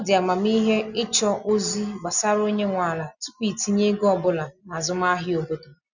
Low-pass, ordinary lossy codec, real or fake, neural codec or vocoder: 7.2 kHz; none; real; none